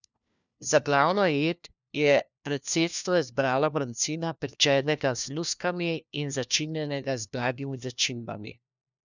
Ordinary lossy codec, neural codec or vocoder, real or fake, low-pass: none; codec, 16 kHz, 1 kbps, FunCodec, trained on LibriTTS, 50 frames a second; fake; 7.2 kHz